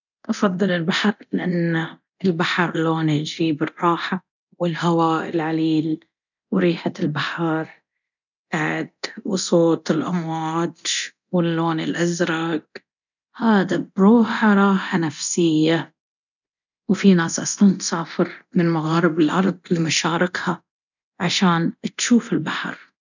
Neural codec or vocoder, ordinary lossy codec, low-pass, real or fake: codec, 24 kHz, 0.9 kbps, DualCodec; none; 7.2 kHz; fake